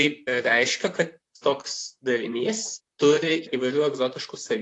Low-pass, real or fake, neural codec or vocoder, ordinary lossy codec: 10.8 kHz; fake; vocoder, 44.1 kHz, 128 mel bands, Pupu-Vocoder; AAC, 48 kbps